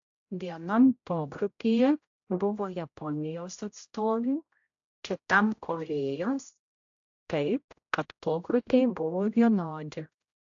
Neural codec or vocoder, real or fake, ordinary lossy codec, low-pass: codec, 16 kHz, 0.5 kbps, X-Codec, HuBERT features, trained on general audio; fake; AAC, 48 kbps; 7.2 kHz